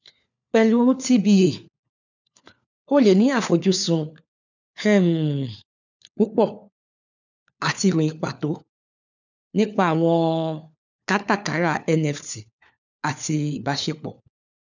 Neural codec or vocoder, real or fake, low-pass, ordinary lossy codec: codec, 16 kHz, 4 kbps, FunCodec, trained on LibriTTS, 50 frames a second; fake; 7.2 kHz; none